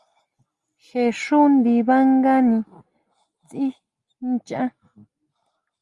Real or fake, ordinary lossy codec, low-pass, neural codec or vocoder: real; Opus, 32 kbps; 10.8 kHz; none